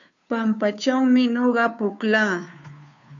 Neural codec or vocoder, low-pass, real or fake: codec, 16 kHz, 2 kbps, FunCodec, trained on Chinese and English, 25 frames a second; 7.2 kHz; fake